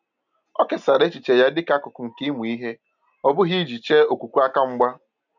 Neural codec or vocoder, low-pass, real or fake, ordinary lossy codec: none; 7.2 kHz; real; none